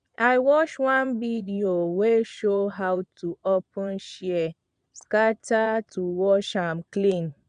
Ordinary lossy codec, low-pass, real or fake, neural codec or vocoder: none; 9.9 kHz; fake; vocoder, 22.05 kHz, 80 mel bands, Vocos